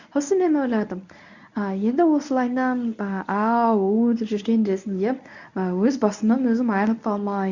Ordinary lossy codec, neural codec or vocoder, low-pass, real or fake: none; codec, 24 kHz, 0.9 kbps, WavTokenizer, medium speech release version 1; 7.2 kHz; fake